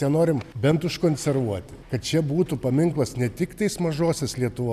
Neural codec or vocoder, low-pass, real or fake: none; 14.4 kHz; real